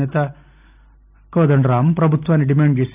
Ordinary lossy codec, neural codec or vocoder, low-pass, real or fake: none; none; 3.6 kHz; real